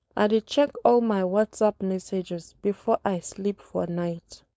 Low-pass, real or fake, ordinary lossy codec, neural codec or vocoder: none; fake; none; codec, 16 kHz, 4.8 kbps, FACodec